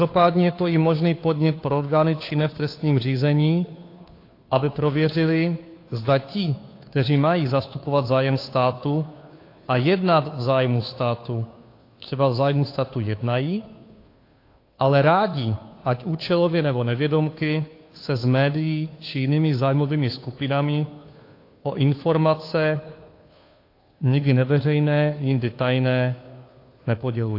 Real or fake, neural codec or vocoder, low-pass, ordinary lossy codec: fake; codec, 16 kHz, 2 kbps, FunCodec, trained on Chinese and English, 25 frames a second; 5.4 kHz; AAC, 32 kbps